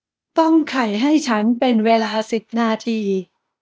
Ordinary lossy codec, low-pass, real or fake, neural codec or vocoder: none; none; fake; codec, 16 kHz, 0.8 kbps, ZipCodec